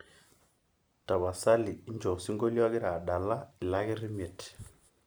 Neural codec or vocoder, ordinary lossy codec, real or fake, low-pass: none; none; real; none